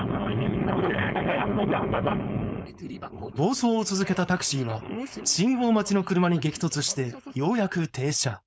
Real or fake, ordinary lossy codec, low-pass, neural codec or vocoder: fake; none; none; codec, 16 kHz, 4.8 kbps, FACodec